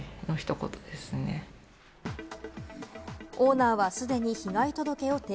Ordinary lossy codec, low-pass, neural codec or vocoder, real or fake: none; none; none; real